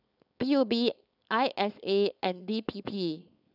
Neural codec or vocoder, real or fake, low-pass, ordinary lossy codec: codec, 16 kHz, 4.8 kbps, FACodec; fake; 5.4 kHz; none